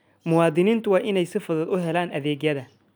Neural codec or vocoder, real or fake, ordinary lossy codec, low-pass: none; real; none; none